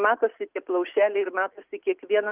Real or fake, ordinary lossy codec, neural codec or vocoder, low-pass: real; Opus, 32 kbps; none; 3.6 kHz